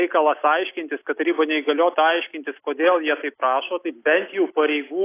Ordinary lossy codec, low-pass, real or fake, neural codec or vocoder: AAC, 24 kbps; 3.6 kHz; real; none